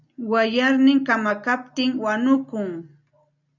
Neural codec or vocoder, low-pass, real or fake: none; 7.2 kHz; real